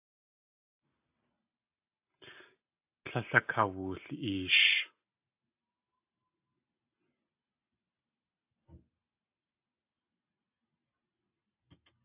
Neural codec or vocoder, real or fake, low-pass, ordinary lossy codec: none; real; 3.6 kHz; AAC, 32 kbps